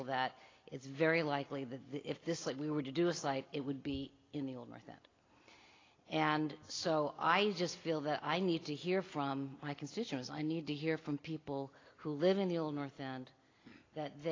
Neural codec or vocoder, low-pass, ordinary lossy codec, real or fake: none; 7.2 kHz; AAC, 32 kbps; real